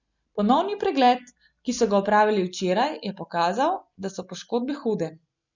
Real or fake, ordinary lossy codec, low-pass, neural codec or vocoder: real; none; 7.2 kHz; none